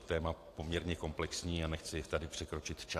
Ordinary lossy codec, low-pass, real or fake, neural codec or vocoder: AAC, 64 kbps; 14.4 kHz; fake; vocoder, 44.1 kHz, 128 mel bands every 256 samples, BigVGAN v2